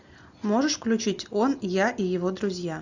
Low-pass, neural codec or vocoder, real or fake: 7.2 kHz; none; real